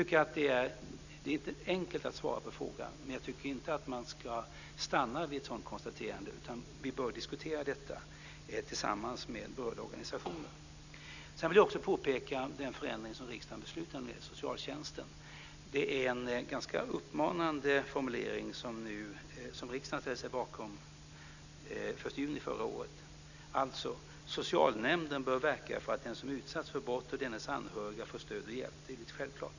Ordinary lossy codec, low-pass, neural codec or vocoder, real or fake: none; 7.2 kHz; none; real